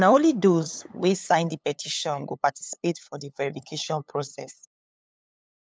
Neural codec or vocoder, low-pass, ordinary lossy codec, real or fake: codec, 16 kHz, 8 kbps, FunCodec, trained on LibriTTS, 25 frames a second; none; none; fake